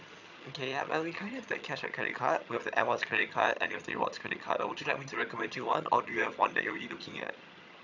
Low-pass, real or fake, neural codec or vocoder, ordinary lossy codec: 7.2 kHz; fake; vocoder, 22.05 kHz, 80 mel bands, HiFi-GAN; none